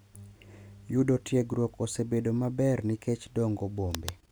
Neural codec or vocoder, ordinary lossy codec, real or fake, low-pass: none; none; real; none